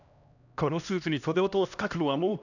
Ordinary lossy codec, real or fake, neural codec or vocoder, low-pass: none; fake; codec, 16 kHz, 1 kbps, X-Codec, HuBERT features, trained on LibriSpeech; 7.2 kHz